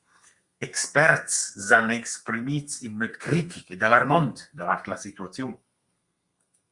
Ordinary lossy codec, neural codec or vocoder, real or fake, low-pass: Opus, 64 kbps; codec, 32 kHz, 1.9 kbps, SNAC; fake; 10.8 kHz